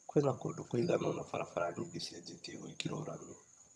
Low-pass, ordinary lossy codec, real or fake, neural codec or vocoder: none; none; fake; vocoder, 22.05 kHz, 80 mel bands, HiFi-GAN